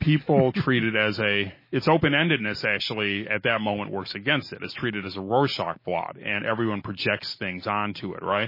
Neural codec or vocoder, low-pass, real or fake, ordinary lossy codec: none; 5.4 kHz; real; MP3, 24 kbps